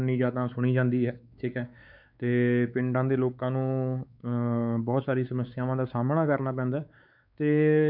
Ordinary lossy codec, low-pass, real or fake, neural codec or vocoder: none; 5.4 kHz; fake; codec, 16 kHz, 4 kbps, X-Codec, WavLM features, trained on Multilingual LibriSpeech